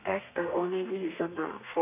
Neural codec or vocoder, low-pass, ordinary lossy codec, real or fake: codec, 32 kHz, 1.9 kbps, SNAC; 3.6 kHz; none; fake